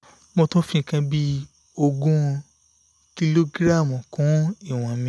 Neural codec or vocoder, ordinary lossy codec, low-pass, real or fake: none; none; none; real